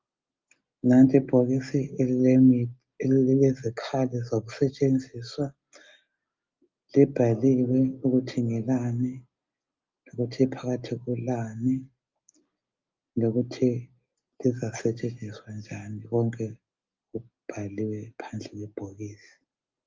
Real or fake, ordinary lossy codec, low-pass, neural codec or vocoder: real; Opus, 24 kbps; 7.2 kHz; none